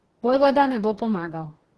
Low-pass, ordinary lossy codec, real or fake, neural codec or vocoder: 10.8 kHz; Opus, 16 kbps; fake; codec, 44.1 kHz, 2.6 kbps, DAC